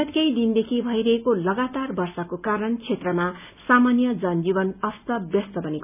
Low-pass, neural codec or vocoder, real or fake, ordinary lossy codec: 3.6 kHz; none; real; none